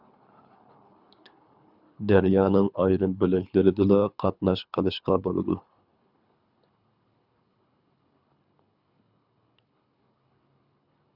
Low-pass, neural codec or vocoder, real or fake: 5.4 kHz; codec, 24 kHz, 3 kbps, HILCodec; fake